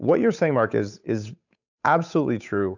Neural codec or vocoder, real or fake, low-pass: codec, 16 kHz, 8 kbps, FunCodec, trained on Chinese and English, 25 frames a second; fake; 7.2 kHz